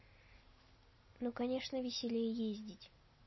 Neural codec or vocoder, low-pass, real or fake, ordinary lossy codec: none; 7.2 kHz; real; MP3, 24 kbps